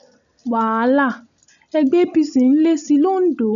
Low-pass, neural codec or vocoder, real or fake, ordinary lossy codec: 7.2 kHz; none; real; none